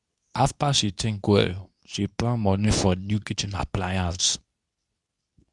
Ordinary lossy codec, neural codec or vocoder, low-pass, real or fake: none; codec, 24 kHz, 0.9 kbps, WavTokenizer, medium speech release version 2; 10.8 kHz; fake